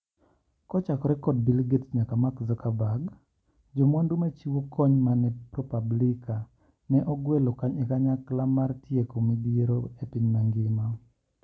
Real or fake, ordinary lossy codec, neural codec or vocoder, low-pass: real; none; none; none